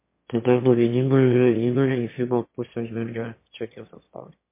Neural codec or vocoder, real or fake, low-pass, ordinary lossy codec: autoencoder, 22.05 kHz, a latent of 192 numbers a frame, VITS, trained on one speaker; fake; 3.6 kHz; MP3, 24 kbps